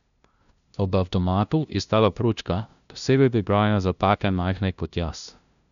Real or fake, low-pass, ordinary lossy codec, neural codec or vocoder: fake; 7.2 kHz; none; codec, 16 kHz, 0.5 kbps, FunCodec, trained on LibriTTS, 25 frames a second